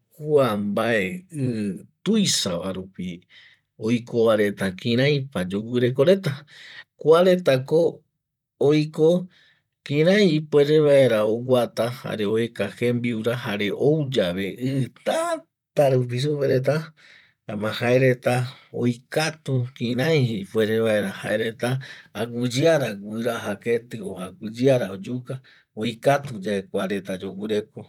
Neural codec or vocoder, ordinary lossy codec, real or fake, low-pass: vocoder, 44.1 kHz, 128 mel bands, Pupu-Vocoder; none; fake; 19.8 kHz